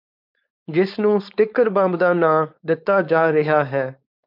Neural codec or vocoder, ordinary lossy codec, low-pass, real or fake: codec, 16 kHz, 4.8 kbps, FACodec; MP3, 48 kbps; 5.4 kHz; fake